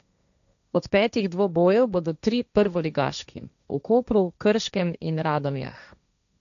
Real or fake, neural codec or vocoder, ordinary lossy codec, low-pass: fake; codec, 16 kHz, 1.1 kbps, Voila-Tokenizer; none; 7.2 kHz